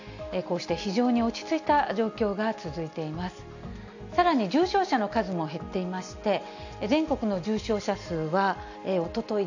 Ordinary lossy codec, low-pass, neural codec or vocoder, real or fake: none; 7.2 kHz; none; real